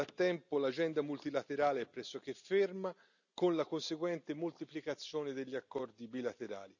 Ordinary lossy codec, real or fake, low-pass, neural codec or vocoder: none; real; 7.2 kHz; none